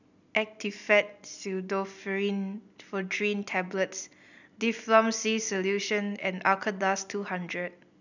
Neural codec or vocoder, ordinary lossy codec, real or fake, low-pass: none; none; real; 7.2 kHz